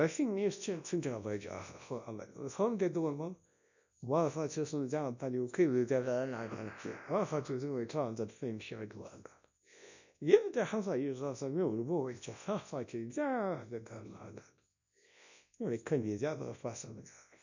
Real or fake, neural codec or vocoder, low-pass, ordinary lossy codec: fake; codec, 24 kHz, 0.9 kbps, WavTokenizer, large speech release; 7.2 kHz; none